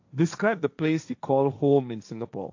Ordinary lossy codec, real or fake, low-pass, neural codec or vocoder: AAC, 48 kbps; fake; 7.2 kHz; codec, 16 kHz, 1.1 kbps, Voila-Tokenizer